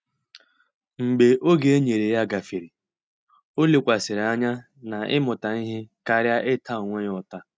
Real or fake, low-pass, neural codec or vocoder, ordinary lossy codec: real; none; none; none